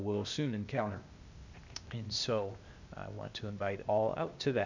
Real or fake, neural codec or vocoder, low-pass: fake; codec, 16 kHz, 0.8 kbps, ZipCodec; 7.2 kHz